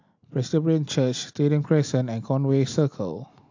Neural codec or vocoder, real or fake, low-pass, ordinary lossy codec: none; real; 7.2 kHz; AAC, 48 kbps